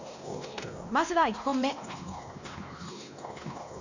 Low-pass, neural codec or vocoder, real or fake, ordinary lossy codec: 7.2 kHz; codec, 16 kHz, 1 kbps, X-Codec, WavLM features, trained on Multilingual LibriSpeech; fake; none